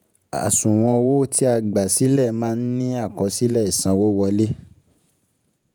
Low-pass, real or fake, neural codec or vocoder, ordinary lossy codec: none; fake; vocoder, 48 kHz, 128 mel bands, Vocos; none